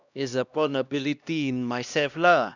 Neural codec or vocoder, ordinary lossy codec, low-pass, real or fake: codec, 16 kHz, 1 kbps, X-Codec, HuBERT features, trained on LibriSpeech; none; 7.2 kHz; fake